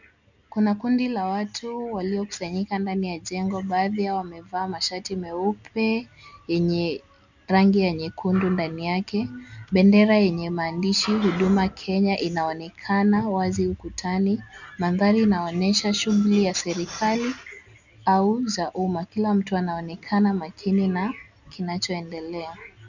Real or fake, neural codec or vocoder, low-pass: real; none; 7.2 kHz